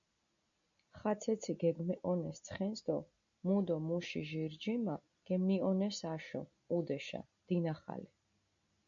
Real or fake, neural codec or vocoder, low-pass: real; none; 7.2 kHz